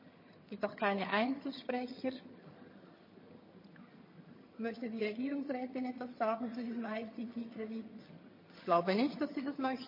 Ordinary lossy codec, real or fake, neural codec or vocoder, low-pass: MP3, 24 kbps; fake; vocoder, 22.05 kHz, 80 mel bands, HiFi-GAN; 5.4 kHz